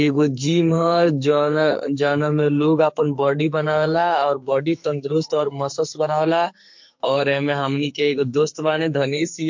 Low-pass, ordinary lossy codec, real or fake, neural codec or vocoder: 7.2 kHz; MP3, 48 kbps; fake; codec, 44.1 kHz, 2.6 kbps, SNAC